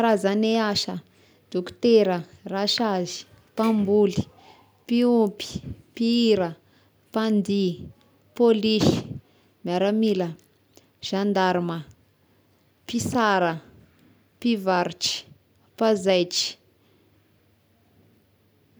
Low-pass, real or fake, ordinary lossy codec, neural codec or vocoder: none; real; none; none